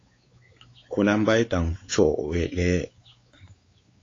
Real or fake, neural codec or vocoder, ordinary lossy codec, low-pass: fake; codec, 16 kHz, 4 kbps, X-Codec, WavLM features, trained on Multilingual LibriSpeech; AAC, 32 kbps; 7.2 kHz